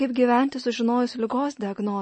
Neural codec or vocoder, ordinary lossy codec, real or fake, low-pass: none; MP3, 32 kbps; real; 10.8 kHz